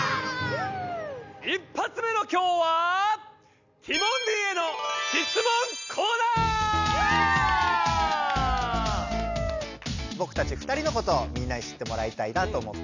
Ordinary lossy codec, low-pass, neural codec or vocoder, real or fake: none; 7.2 kHz; none; real